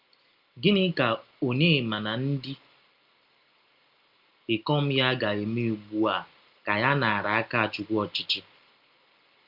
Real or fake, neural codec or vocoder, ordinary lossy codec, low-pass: real; none; Opus, 32 kbps; 5.4 kHz